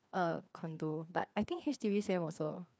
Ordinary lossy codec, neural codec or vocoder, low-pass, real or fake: none; codec, 16 kHz, 2 kbps, FreqCodec, larger model; none; fake